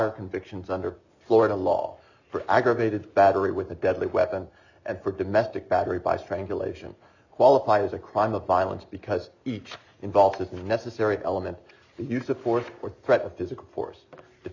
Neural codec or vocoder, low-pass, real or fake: none; 7.2 kHz; real